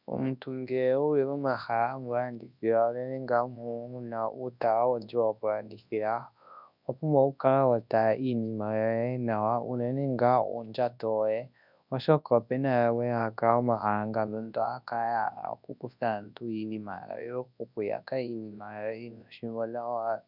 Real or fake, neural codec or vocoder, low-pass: fake; codec, 24 kHz, 0.9 kbps, WavTokenizer, large speech release; 5.4 kHz